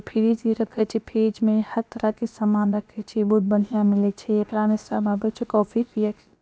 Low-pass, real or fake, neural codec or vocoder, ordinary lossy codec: none; fake; codec, 16 kHz, about 1 kbps, DyCAST, with the encoder's durations; none